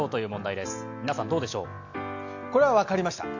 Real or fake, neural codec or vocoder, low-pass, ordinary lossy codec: real; none; 7.2 kHz; none